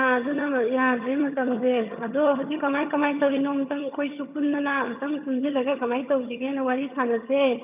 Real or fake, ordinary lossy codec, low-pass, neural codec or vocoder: fake; none; 3.6 kHz; vocoder, 22.05 kHz, 80 mel bands, HiFi-GAN